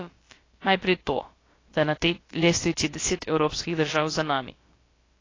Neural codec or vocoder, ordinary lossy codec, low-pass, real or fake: codec, 16 kHz, about 1 kbps, DyCAST, with the encoder's durations; AAC, 32 kbps; 7.2 kHz; fake